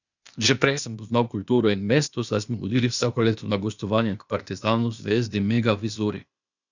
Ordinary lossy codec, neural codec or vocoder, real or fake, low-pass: none; codec, 16 kHz, 0.8 kbps, ZipCodec; fake; 7.2 kHz